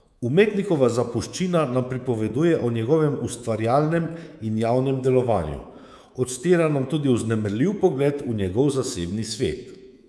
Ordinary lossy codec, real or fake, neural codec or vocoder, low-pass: none; fake; codec, 24 kHz, 3.1 kbps, DualCodec; none